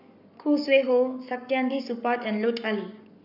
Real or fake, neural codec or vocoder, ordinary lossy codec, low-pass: fake; codec, 44.1 kHz, 7.8 kbps, Pupu-Codec; none; 5.4 kHz